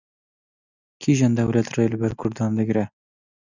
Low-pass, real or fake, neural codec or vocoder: 7.2 kHz; real; none